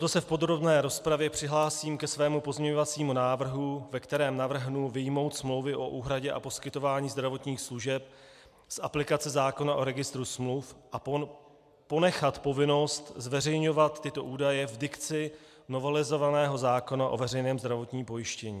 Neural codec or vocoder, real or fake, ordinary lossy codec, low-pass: none; real; MP3, 96 kbps; 14.4 kHz